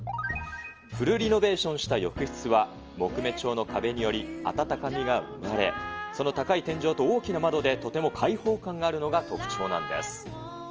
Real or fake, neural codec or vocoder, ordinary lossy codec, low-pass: real; none; Opus, 24 kbps; 7.2 kHz